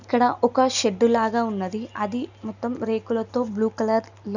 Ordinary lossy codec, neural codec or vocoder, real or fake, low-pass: none; none; real; 7.2 kHz